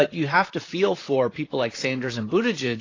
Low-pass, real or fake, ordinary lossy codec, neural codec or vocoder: 7.2 kHz; fake; AAC, 32 kbps; vocoder, 44.1 kHz, 128 mel bands, Pupu-Vocoder